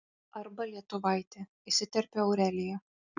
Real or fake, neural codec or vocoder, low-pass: real; none; 7.2 kHz